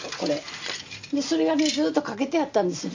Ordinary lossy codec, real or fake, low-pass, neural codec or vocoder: MP3, 48 kbps; real; 7.2 kHz; none